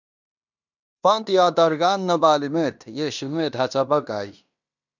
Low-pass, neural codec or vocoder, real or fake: 7.2 kHz; codec, 16 kHz in and 24 kHz out, 0.9 kbps, LongCat-Audio-Codec, fine tuned four codebook decoder; fake